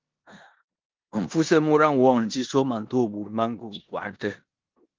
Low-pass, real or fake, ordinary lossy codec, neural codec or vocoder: 7.2 kHz; fake; Opus, 32 kbps; codec, 16 kHz in and 24 kHz out, 0.9 kbps, LongCat-Audio-Codec, four codebook decoder